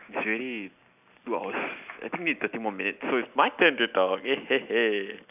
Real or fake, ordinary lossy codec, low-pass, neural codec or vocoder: real; none; 3.6 kHz; none